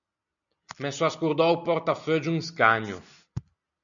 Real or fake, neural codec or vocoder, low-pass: real; none; 7.2 kHz